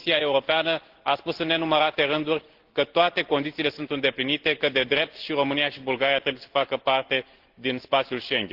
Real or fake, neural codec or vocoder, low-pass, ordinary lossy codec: real; none; 5.4 kHz; Opus, 16 kbps